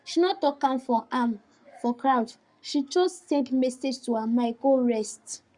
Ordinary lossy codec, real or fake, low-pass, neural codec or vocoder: Opus, 64 kbps; fake; 10.8 kHz; codec, 44.1 kHz, 7.8 kbps, Pupu-Codec